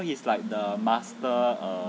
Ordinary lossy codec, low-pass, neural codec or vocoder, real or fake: none; none; none; real